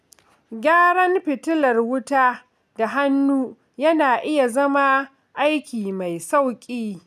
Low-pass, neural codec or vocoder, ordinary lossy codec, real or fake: 14.4 kHz; none; none; real